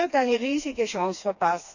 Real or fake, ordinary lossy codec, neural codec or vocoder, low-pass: fake; none; codec, 16 kHz, 2 kbps, FreqCodec, smaller model; 7.2 kHz